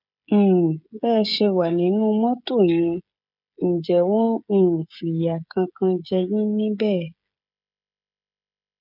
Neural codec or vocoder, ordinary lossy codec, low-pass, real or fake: codec, 16 kHz, 16 kbps, FreqCodec, smaller model; none; 5.4 kHz; fake